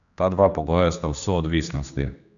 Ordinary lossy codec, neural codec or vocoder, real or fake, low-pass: none; codec, 16 kHz, 2 kbps, X-Codec, HuBERT features, trained on general audio; fake; 7.2 kHz